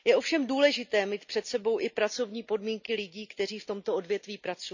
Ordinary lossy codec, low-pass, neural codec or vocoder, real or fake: none; 7.2 kHz; none; real